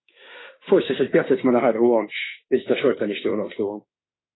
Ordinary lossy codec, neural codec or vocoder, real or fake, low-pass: AAC, 16 kbps; autoencoder, 48 kHz, 32 numbers a frame, DAC-VAE, trained on Japanese speech; fake; 7.2 kHz